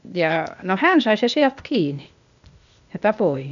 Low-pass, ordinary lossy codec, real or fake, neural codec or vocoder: 7.2 kHz; none; fake; codec, 16 kHz, 0.8 kbps, ZipCodec